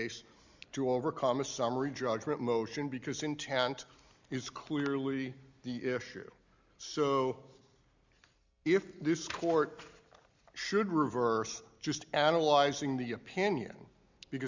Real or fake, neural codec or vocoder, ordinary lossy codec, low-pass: real; none; Opus, 64 kbps; 7.2 kHz